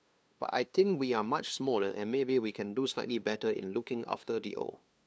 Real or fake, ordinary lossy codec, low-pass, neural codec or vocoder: fake; none; none; codec, 16 kHz, 2 kbps, FunCodec, trained on LibriTTS, 25 frames a second